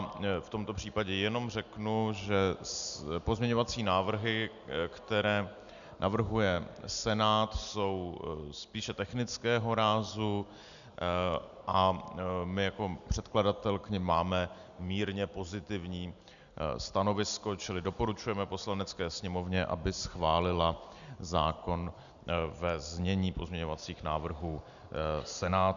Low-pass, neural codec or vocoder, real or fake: 7.2 kHz; none; real